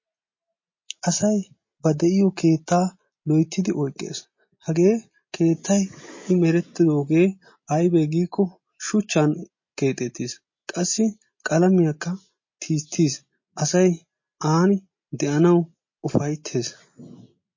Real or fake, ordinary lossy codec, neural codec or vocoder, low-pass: real; MP3, 32 kbps; none; 7.2 kHz